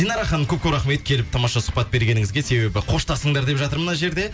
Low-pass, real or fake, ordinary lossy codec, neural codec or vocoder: none; real; none; none